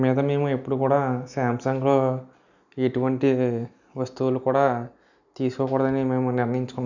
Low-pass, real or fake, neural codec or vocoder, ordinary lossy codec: 7.2 kHz; real; none; none